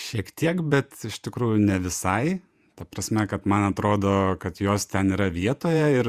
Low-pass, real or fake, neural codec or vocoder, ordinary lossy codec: 14.4 kHz; fake; vocoder, 48 kHz, 128 mel bands, Vocos; Opus, 64 kbps